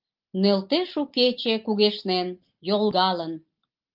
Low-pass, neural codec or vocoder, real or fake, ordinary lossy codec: 5.4 kHz; none; real; Opus, 24 kbps